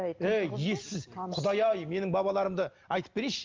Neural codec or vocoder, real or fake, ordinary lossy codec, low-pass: none; real; Opus, 32 kbps; 7.2 kHz